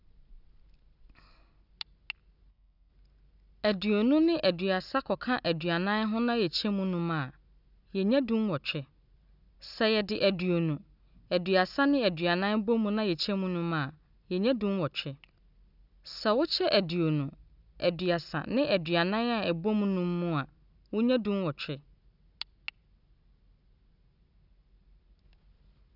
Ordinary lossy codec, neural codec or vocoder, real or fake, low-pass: none; none; real; 5.4 kHz